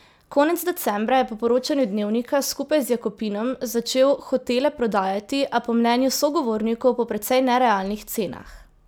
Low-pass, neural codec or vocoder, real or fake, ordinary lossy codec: none; none; real; none